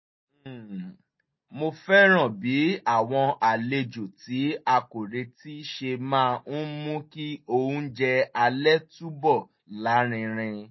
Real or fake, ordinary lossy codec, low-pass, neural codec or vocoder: real; MP3, 24 kbps; 7.2 kHz; none